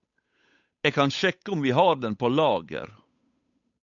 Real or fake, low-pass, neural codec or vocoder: fake; 7.2 kHz; codec, 16 kHz, 8 kbps, FunCodec, trained on Chinese and English, 25 frames a second